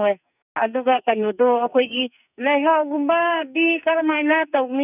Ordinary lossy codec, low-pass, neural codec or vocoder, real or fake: none; 3.6 kHz; codec, 44.1 kHz, 2.6 kbps, SNAC; fake